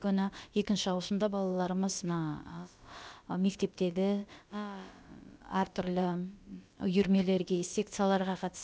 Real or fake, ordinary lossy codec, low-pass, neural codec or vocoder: fake; none; none; codec, 16 kHz, about 1 kbps, DyCAST, with the encoder's durations